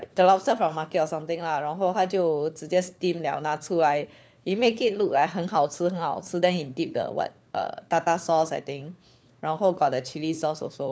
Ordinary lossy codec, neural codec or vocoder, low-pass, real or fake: none; codec, 16 kHz, 4 kbps, FunCodec, trained on LibriTTS, 50 frames a second; none; fake